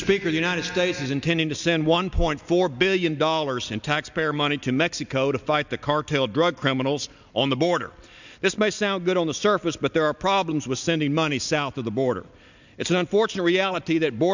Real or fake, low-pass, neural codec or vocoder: real; 7.2 kHz; none